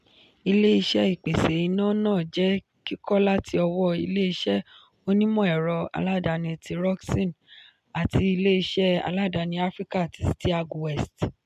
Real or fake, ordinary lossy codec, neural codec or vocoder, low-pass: real; MP3, 96 kbps; none; 14.4 kHz